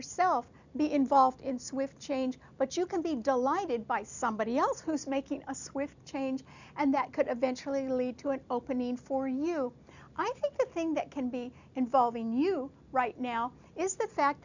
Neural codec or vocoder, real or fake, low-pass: none; real; 7.2 kHz